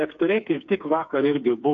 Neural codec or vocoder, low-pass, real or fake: codec, 16 kHz, 4 kbps, FreqCodec, smaller model; 7.2 kHz; fake